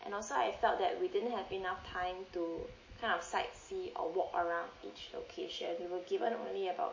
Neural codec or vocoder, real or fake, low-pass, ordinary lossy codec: none; real; 7.2 kHz; MP3, 32 kbps